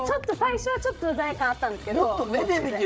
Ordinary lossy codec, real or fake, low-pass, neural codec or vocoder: none; fake; none; codec, 16 kHz, 8 kbps, FreqCodec, larger model